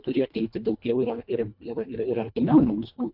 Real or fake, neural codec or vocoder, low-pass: fake; codec, 24 kHz, 1.5 kbps, HILCodec; 5.4 kHz